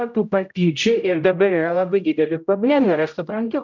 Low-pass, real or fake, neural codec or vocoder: 7.2 kHz; fake; codec, 16 kHz, 0.5 kbps, X-Codec, HuBERT features, trained on general audio